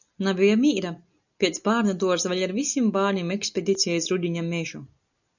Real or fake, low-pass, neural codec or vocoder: real; 7.2 kHz; none